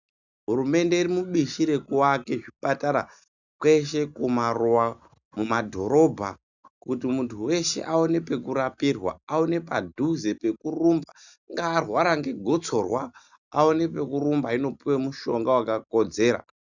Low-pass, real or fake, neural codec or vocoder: 7.2 kHz; real; none